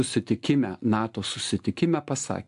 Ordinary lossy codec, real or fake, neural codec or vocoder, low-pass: AAC, 64 kbps; real; none; 10.8 kHz